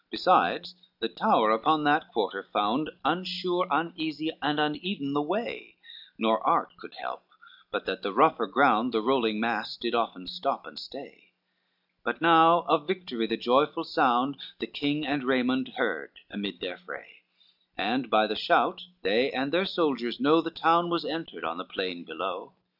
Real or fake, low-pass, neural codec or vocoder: real; 5.4 kHz; none